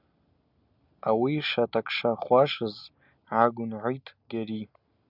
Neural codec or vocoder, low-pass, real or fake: none; 5.4 kHz; real